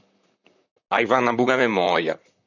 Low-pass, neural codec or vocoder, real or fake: 7.2 kHz; vocoder, 44.1 kHz, 128 mel bands, Pupu-Vocoder; fake